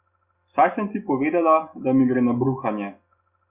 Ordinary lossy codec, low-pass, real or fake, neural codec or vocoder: Opus, 64 kbps; 3.6 kHz; real; none